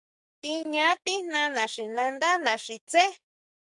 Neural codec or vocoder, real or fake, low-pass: codec, 44.1 kHz, 2.6 kbps, SNAC; fake; 10.8 kHz